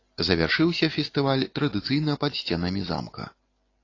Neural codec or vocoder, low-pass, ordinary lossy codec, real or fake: none; 7.2 kHz; AAC, 32 kbps; real